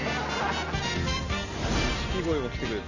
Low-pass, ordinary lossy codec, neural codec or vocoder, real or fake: 7.2 kHz; none; none; real